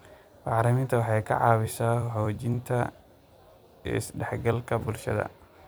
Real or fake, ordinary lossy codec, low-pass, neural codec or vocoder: fake; none; none; vocoder, 44.1 kHz, 128 mel bands every 256 samples, BigVGAN v2